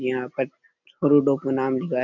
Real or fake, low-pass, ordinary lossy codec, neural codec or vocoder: real; 7.2 kHz; none; none